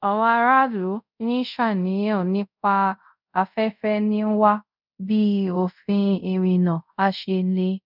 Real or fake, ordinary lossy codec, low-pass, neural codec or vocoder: fake; none; 5.4 kHz; codec, 24 kHz, 0.5 kbps, DualCodec